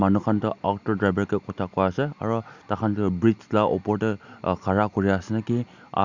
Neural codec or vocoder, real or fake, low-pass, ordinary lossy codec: none; real; 7.2 kHz; Opus, 64 kbps